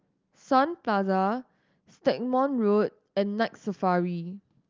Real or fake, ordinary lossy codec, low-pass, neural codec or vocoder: real; Opus, 32 kbps; 7.2 kHz; none